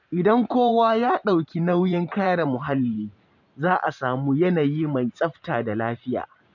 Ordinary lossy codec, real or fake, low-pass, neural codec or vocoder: none; fake; 7.2 kHz; vocoder, 44.1 kHz, 128 mel bands every 512 samples, BigVGAN v2